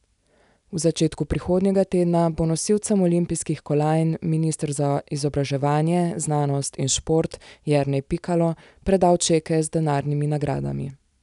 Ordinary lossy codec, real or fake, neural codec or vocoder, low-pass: none; real; none; 10.8 kHz